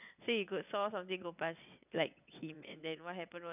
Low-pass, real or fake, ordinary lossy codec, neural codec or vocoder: 3.6 kHz; fake; none; vocoder, 22.05 kHz, 80 mel bands, WaveNeXt